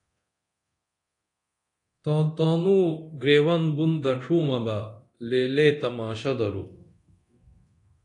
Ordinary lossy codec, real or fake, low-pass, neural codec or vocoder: AAC, 48 kbps; fake; 10.8 kHz; codec, 24 kHz, 0.9 kbps, DualCodec